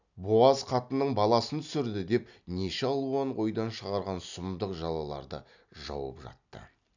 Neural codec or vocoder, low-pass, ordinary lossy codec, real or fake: none; 7.2 kHz; none; real